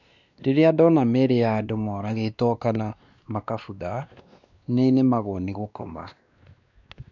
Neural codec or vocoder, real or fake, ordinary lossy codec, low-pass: codec, 16 kHz, 2 kbps, X-Codec, WavLM features, trained on Multilingual LibriSpeech; fake; none; 7.2 kHz